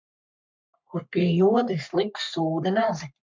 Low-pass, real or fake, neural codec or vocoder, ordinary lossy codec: 7.2 kHz; fake; codec, 44.1 kHz, 3.4 kbps, Pupu-Codec; MP3, 64 kbps